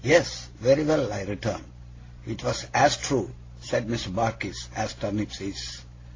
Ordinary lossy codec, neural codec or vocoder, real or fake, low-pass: AAC, 32 kbps; none; real; 7.2 kHz